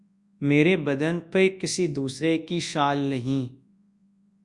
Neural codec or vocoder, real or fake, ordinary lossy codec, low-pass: codec, 24 kHz, 0.9 kbps, WavTokenizer, large speech release; fake; Opus, 64 kbps; 10.8 kHz